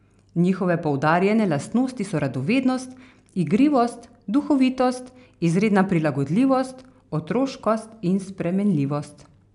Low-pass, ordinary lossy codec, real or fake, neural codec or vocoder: 10.8 kHz; AAC, 96 kbps; real; none